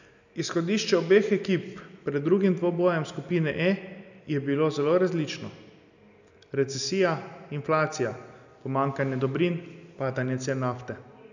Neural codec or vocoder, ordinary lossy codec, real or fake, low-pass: none; none; real; 7.2 kHz